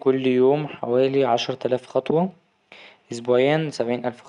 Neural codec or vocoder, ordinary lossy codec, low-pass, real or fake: none; none; 10.8 kHz; real